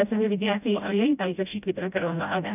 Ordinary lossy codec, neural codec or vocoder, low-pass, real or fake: none; codec, 16 kHz, 0.5 kbps, FreqCodec, smaller model; 3.6 kHz; fake